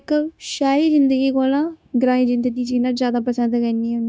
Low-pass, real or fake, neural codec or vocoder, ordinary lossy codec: none; fake; codec, 16 kHz, 0.9 kbps, LongCat-Audio-Codec; none